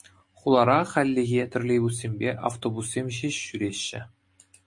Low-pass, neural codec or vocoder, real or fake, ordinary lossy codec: 10.8 kHz; none; real; MP3, 48 kbps